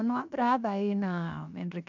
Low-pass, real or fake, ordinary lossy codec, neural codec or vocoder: 7.2 kHz; fake; none; codec, 16 kHz, 0.7 kbps, FocalCodec